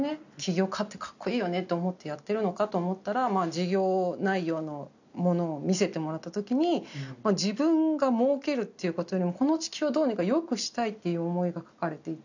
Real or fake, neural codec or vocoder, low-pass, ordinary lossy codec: real; none; 7.2 kHz; none